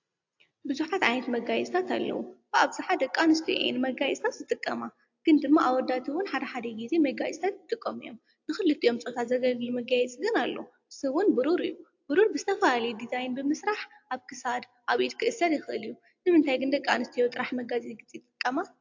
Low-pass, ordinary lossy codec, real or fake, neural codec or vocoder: 7.2 kHz; AAC, 48 kbps; real; none